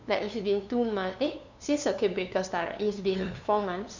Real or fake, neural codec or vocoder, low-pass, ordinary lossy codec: fake; codec, 16 kHz, 2 kbps, FunCodec, trained on LibriTTS, 25 frames a second; 7.2 kHz; none